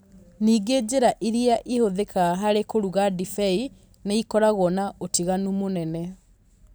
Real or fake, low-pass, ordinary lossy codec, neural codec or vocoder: real; none; none; none